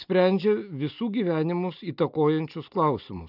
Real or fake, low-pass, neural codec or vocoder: real; 5.4 kHz; none